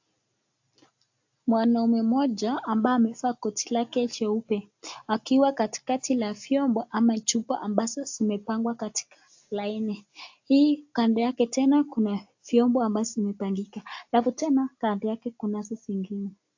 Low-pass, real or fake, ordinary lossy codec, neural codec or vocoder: 7.2 kHz; real; AAC, 48 kbps; none